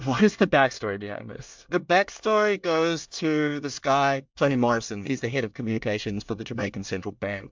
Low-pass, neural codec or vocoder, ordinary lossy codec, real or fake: 7.2 kHz; codec, 24 kHz, 1 kbps, SNAC; MP3, 64 kbps; fake